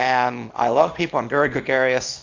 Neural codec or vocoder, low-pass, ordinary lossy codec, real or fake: codec, 24 kHz, 0.9 kbps, WavTokenizer, small release; 7.2 kHz; AAC, 48 kbps; fake